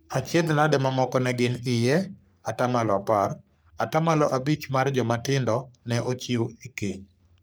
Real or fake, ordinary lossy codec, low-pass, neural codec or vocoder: fake; none; none; codec, 44.1 kHz, 3.4 kbps, Pupu-Codec